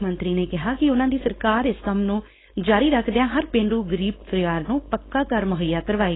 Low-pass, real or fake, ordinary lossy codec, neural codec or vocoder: 7.2 kHz; fake; AAC, 16 kbps; codec, 16 kHz, 4.8 kbps, FACodec